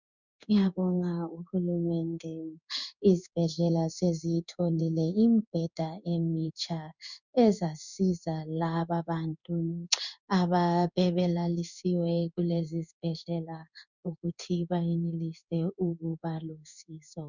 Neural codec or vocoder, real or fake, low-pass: codec, 16 kHz in and 24 kHz out, 1 kbps, XY-Tokenizer; fake; 7.2 kHz